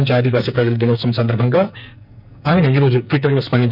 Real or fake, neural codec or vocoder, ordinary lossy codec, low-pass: fake; codec, 44.1 kHz, 2.6 kbps, SNAC; none; 5.4 kHz